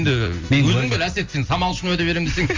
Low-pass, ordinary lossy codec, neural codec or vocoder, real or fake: 7.2 kHz; Opus, 32 kbps; none; real